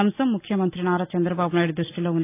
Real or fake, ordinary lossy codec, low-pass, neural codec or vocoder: real; AAC, 24 kbps; 3.6 kHz; none